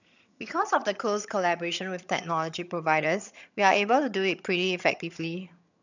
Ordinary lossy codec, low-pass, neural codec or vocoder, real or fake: none; 7.2 kHz; vocoder, 22.05 kHz, 80 mel bands, HiFi-GAN; fake